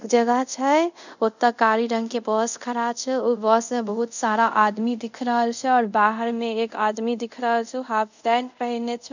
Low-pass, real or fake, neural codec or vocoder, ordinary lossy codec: 7.2 kHz; fake; codec, 24 kHz, 0.5 kbps, DualCodec; none